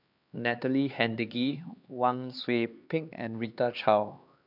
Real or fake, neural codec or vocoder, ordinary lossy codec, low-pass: fake; codec, 16 kHz, 2 kbps, X-Codec, HuBERT features, trained on LibriSpeech; none; 5.4 kHz